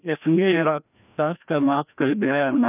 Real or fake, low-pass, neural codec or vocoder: fake; 3.6 kHz; codec, 16 kHz, 1 kbps, FreqCodec, larger model